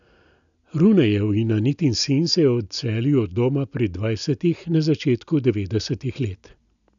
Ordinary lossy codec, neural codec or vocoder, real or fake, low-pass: none; none; real; 7.2 kHz